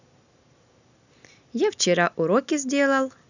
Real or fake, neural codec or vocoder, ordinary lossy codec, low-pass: real; none; none; 7.2 kHz